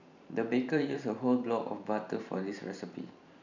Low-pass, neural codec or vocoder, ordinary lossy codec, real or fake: 7.2 kHz; vocoder, 44.1 kHz, 128 mel bands every 512 samples, BigVGAN v2; none; fake